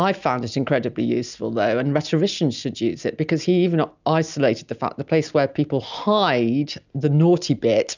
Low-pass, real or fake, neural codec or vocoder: 7.2 kHz; real; none